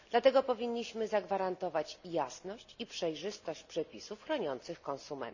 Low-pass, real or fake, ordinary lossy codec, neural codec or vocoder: 7.2 kHz; real; none; none